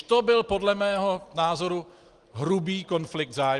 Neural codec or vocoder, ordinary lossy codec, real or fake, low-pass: none; Opus, 24 kbps; real; 10.8 kHz